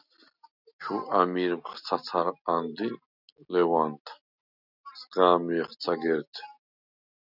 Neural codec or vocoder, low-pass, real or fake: none; 5.4 kHz; real